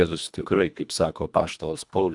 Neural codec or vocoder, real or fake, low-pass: codec, 24 kHz, 1.5 kbps, HILCodec; fake; 10.8 kHz